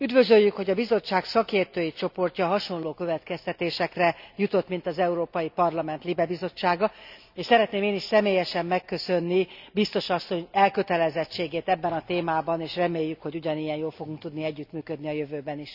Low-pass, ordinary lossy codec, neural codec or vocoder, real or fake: 5.4 kHz; none; none; real